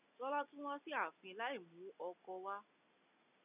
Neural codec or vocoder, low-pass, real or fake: none; 3.6 kHz; real